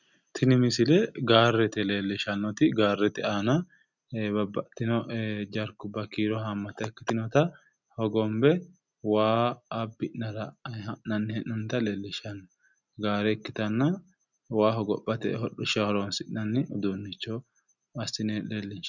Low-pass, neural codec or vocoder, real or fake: 7.2 kHz; none; real